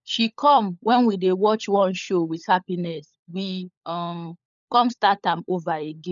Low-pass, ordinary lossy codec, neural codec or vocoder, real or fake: 7.2 kHz; none; codec, 16 kHz, 16 kbps, FunCodec, trained on LibriTTS, 50 frames a second; fake